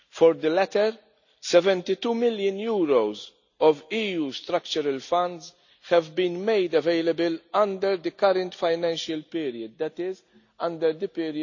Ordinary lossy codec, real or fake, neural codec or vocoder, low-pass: none; real; none; 7.2 kHz